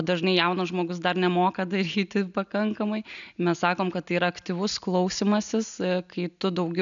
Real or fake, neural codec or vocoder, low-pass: real; none; 7.2 kHz